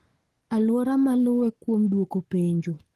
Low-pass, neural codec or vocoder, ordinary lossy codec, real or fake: 14.4 kHz; codec, 44.1 kHz, 7.8 kbps, Pupu-Codec; Opus, 16 kbps; fake